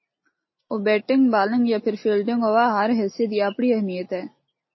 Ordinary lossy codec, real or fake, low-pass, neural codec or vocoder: MP3, 24 kbps; real; 7.2 kHz; none